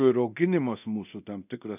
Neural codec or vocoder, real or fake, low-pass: codec, 24 kHz, 0.9 kbps, DualCodec; fake; 3.6 kHz